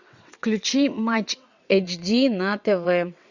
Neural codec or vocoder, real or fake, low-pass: vocoder, 22.05 kHz, 80 mel bands, WaveNeXt; fake; 7.2 kHz